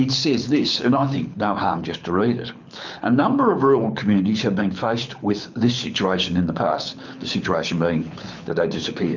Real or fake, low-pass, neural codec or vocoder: fake; 7.2 kHz; codec, 24 kHz, 6 kbps, HILCodec